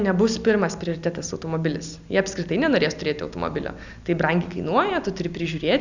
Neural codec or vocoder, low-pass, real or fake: none; 7.2 kHz; real